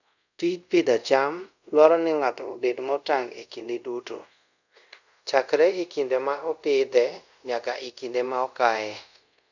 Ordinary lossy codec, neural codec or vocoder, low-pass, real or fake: none; codec, 24 kHz, 0.5 kbps, DualCodec; 7.2 kHz; fake